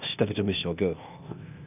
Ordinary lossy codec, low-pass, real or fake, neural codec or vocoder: none; 3.6 kHz; fake; codec, 16 kHz, 0.8 kbps, ZipCodec